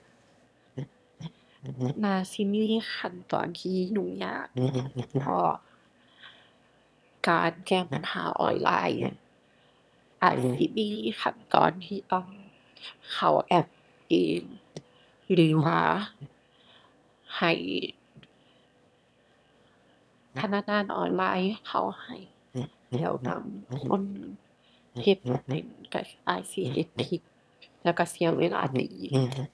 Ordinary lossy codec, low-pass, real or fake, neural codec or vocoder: none; none; fake; autoencoder, 22.05 kHz, a latent of 192 numbers a frame, VITS, trained on one speaker